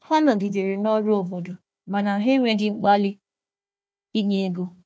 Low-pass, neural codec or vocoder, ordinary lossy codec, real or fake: none; codec, 16 kHz, 1 kbps, FunCodec, trained on Chinese and English, 50 frames a second; none; fake